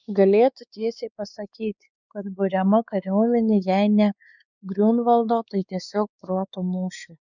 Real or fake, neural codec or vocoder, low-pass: fake; codec, 16 kHz, 4 kbps, X-Codec, WavLM features, trained on Multilingual LibriSpeech; 7.2 kHz